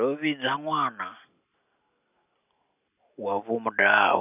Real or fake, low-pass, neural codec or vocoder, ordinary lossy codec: real; 3.6 kHz; none; none